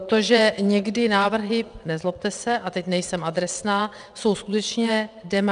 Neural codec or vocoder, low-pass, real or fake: vocoder, 22.05 kHz, 80 mel bands, WaveNeXt; 9.9 kHz; fake